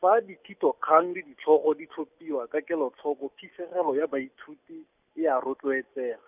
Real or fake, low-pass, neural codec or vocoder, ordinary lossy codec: real; 3.6 kHz; none; none